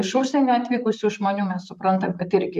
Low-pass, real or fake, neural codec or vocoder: 14.4 kHz; fake; vocoder, 44.1 kHz, 128 mel bands, Pupu-Vocoder